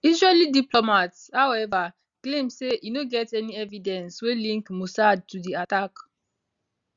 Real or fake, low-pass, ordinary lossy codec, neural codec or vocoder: real; 7.2 kHz; Opus, 64 kbps; none